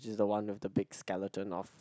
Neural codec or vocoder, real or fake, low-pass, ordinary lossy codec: none; real; none; none